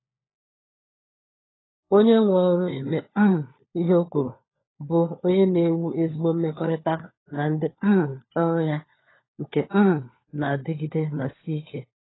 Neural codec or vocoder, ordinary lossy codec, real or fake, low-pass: codec, 16 kHz, 4 kbps, FunCodec, trained on LibriTTS, 50 frames a second; AAC, 16 kbps; fake; 7.2 kHz